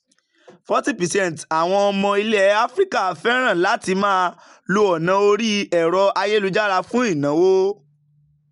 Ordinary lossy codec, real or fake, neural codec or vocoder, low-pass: MP3, 96 kbps; real; none; 10.8 kHz